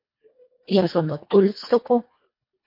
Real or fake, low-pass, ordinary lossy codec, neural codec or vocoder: fake; 5.4 kHz; MP3, 32 kbps; codec, 24 kHz, 1.5 kbps, HILCodec